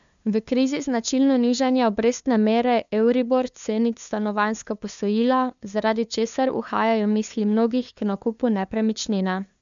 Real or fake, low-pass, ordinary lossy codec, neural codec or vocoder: fake; 7.2 kHz; MP3, 96 kbps; codec, 16 kHz, 2 kbps, FunCodec, trained on LibriTTS, 25 frames a second